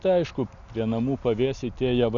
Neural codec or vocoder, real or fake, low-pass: none; real; 7.2 kHz